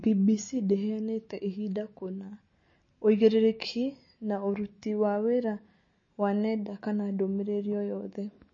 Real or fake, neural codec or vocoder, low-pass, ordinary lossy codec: real; none; 7.2 kHz; MP3, 32 kbps